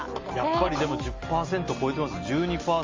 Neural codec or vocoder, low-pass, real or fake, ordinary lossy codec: none; 7.2 kHz; real; Opus, 32 kbps